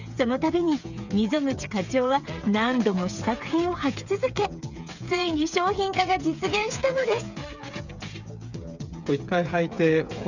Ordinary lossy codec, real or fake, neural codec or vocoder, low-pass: none; fake; codec, 16 kHz, 8 kbps, FreqCodec, smaller model; 7.2 kHz